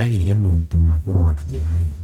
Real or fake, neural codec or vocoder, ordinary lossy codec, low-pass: fake; codec, 44.1 kHz, 0.9 kbps, DAC; none; 19.8 kHz